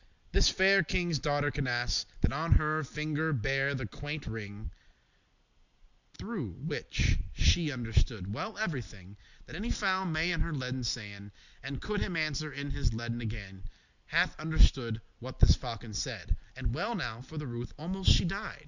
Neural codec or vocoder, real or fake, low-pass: none; real; 7.2 kHz